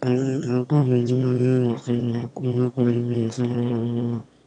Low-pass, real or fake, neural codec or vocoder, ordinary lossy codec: 9.9 kHz; fake; autoencoder, 22.05 kHz, a latent of 192 numbers a frame, VITS, trained on one speaker; none